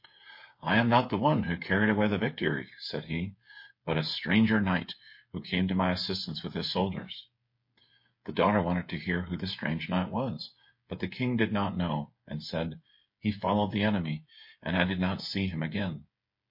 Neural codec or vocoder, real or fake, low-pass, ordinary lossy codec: codec, 16 kHz, 8 kbps, FreqCodec, smaller model; fake; 5.4 kHz; MP3, 32 kbps